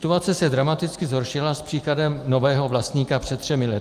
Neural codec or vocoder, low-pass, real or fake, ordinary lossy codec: none; 14.4 kHz; real; Opus, 32 kbps